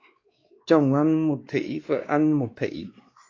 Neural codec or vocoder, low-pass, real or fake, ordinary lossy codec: codec, 16 kHz, 2 kbps, X-Codec, WavLM features, trained on Multilingual LibriSpeech; 7.2 kHz; fake; AAC, 32 kbps